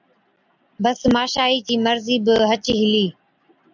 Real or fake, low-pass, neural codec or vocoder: real; 7.2 kHz; none